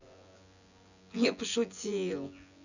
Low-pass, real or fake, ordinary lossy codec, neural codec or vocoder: 7.2 kHz; fake; none; vocoder, 24 kHz, 100 mel bands, Vocos